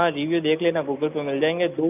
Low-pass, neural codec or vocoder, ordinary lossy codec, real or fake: 3.6 kHz; none; none; real